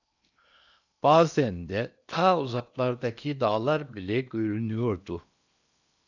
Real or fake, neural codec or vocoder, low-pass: fake; codec, 16 kHz in and 24 kHz out, 0.8 kbps, FocalCodec, streaming, 65536 codes; 7.2 kHz